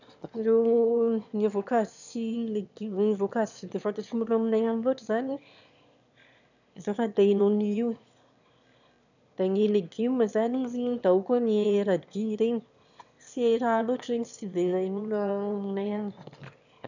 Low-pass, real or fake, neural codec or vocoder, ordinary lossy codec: 7.2 kHz; fake; autoencoder, 22.05 kHz, a latent of 192 numbers a frame, VITS, trained on one speaker; none